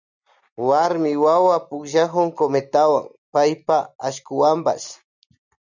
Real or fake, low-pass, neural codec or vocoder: real; 7.2 kHz; none